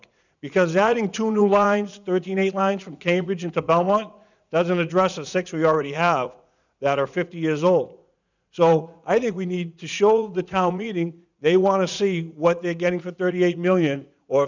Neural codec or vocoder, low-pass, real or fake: vocoder, 44.1 kHz, 80 mel bands, Vocos; 7.2 kHz; fake